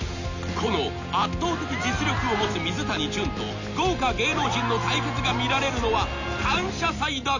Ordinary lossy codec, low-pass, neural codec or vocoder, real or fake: none; 7.2 kHz; none; real